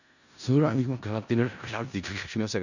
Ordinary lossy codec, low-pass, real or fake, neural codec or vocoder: none; 7.2 kHz; fake; codec, 16 kHz in and 24 kHz out, 0.4 kbps, LongCat-Audio-Codec, four codebook decoder